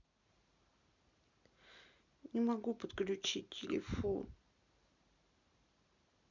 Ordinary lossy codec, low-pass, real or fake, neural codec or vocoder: MP3, 64 kbps; 7.2 kHz; real; none